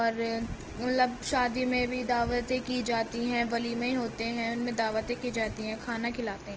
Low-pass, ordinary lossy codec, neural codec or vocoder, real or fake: 7.2 kHz; Opus, 16 kbps; none; real